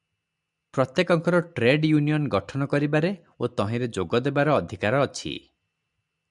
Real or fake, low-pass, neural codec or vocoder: real; 10.8 kHz; none